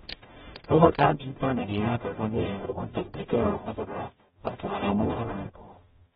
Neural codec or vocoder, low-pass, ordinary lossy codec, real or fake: codec, 44.1 kHz, 0.9 kbps, DAC; 19.8 kHz; AAC, 16 kbps; fake